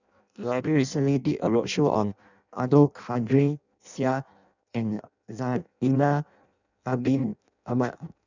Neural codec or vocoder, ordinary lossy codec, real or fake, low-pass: codec, 16 kHz in and 24 kHz out, 0.6 kbps, FireRedTTS-2 codec; none; fake; 7.2 kHz